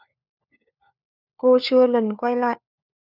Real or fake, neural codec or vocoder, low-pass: fake; codec, 16 kHz, 4 kbps, FunCodec, trained on LibriTTS, 50 frames a second; 5.4 kHz